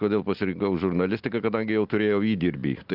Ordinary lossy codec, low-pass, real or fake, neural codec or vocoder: Opus, 32 kbps; 5.4 kHz; real; none